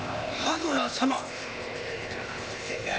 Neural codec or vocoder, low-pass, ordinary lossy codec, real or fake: codec, 16 kHz, 0.8 kbps, ZipCodec; none; none; fake